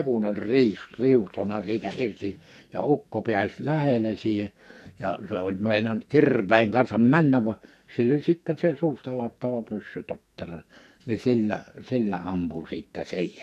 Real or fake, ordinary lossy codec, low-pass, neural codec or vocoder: fake; none; 14.4 kHz; codec, 44.1 kHz, 2.6 kbps, SNAC